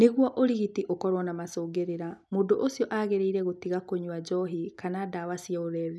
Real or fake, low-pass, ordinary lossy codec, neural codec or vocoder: real; none; none; none